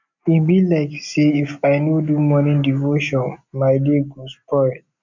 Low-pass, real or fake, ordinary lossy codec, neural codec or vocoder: 7.2 kHz; real; none; none